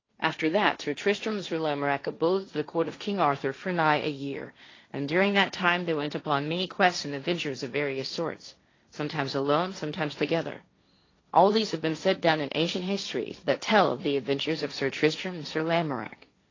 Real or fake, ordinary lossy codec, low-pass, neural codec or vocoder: fake; AAC, 32 kbps; 7.2 kHz; codec, 16 kHz, 1.1 kbps, Voila-Tokenizer